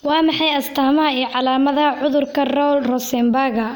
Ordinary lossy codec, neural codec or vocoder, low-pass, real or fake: none; none; 19.8 kHz; real